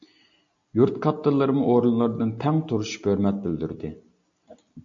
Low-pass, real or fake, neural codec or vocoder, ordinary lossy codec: 7.2 kHz; real; none; AAC, 64 kbps